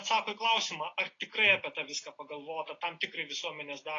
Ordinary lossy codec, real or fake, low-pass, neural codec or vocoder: AAC, 32 kbps; real; 7.2 kHz; none